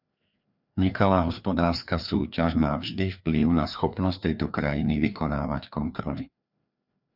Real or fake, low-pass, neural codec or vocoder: fake; 5.4 kHz; codec, 16 kHz, 2 kbps, FreqCodec, larger model